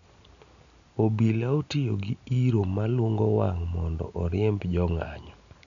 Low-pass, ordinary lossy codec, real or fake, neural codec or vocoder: 7.2 kHz; none; real; none